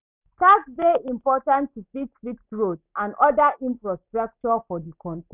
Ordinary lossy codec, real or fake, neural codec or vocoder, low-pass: none; real; none; 3.6 kHz